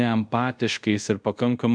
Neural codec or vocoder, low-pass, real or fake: codec, 24 kHz, 0.5 kbps, DualCodec; 9.9 kHz; fake